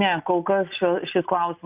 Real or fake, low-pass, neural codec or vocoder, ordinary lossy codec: real; 3.6 kHz; none; Opus, 64 kbps